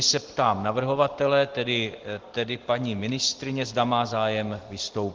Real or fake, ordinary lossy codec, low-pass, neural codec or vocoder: real; Opus, 16 kbps; 7.2 kHz; none